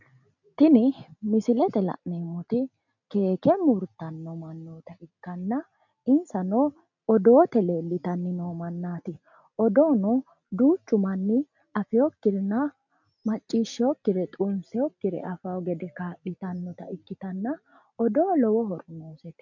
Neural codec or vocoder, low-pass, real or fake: none; 7.2 kHz; real